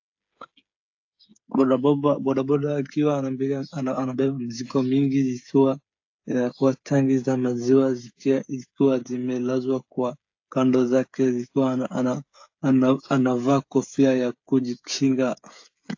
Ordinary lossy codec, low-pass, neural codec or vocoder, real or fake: AAC, 48 kbps; 7.2 kHz; codec, 16 kHz, 8 kbps, FreqCodec, smaller model; fake